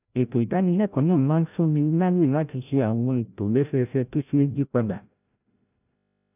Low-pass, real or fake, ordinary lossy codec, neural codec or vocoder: 3.6 kHz; fake; none; codec, 16 kHz, 0.5 kbps, FreqCodec, larger model